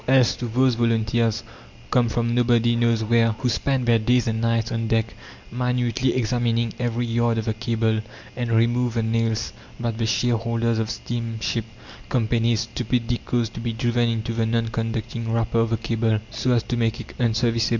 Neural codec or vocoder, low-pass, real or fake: none; 7.2 kHz; real